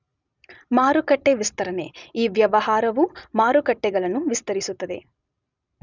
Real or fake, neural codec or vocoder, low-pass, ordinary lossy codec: real; none; 7.2 kHz; none